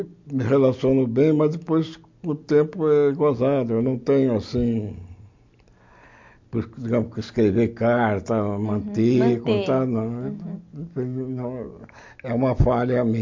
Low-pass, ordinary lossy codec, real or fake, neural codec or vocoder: 7.2 kHz; MP3, 48 kbps; real; none